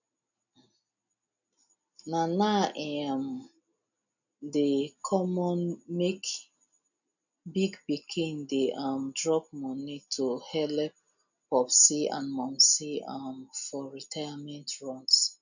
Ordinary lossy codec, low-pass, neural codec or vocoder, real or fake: none; 7.2 kHz; none; real